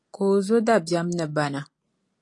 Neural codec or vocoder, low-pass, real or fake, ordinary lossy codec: none; 10.8 kHz; real; AAC, 48 kbps